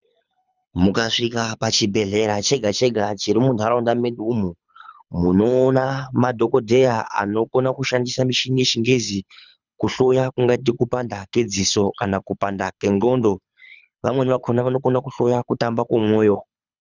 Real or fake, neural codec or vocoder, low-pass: fake; codec, 24 kHz, 6 kbps, HILCodec; 7.2 kHz